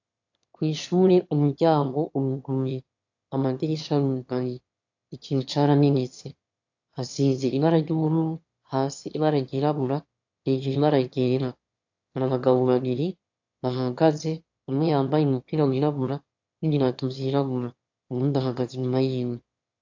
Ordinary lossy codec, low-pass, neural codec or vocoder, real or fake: AAC, 48 kbps; 7.2 kHz; autoencoder, 22.05 kHz, a latent of 192 numbers a frame, VITS, trained on one speaker; fake